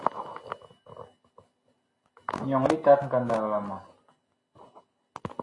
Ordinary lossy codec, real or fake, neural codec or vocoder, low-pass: AAC, 48 kbps; real; none; 10.8 kHz